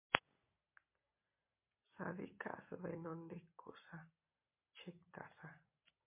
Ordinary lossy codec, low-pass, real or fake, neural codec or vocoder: MP3, 24 kbps; 3.6 kHz; real; none